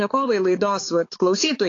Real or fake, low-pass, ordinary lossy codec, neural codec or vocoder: fake; 7.2 kHz; AAC, 32 kbps; codec, 16 kHz, 4 kbps, X-Codec, HuBERT features, trained on balanced general audio